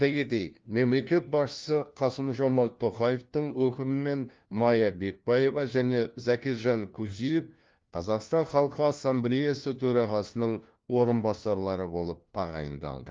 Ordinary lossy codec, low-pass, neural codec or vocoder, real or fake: Opus, 32 kbps; 7.2 kHz; codec, 16 kHz, 1 kbps, FunCodec, trained on LibriTTS, 50 frames a second; fake